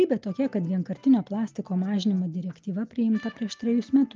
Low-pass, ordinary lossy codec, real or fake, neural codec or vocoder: 7.2 kHz; Opus, 24 kbps; real; none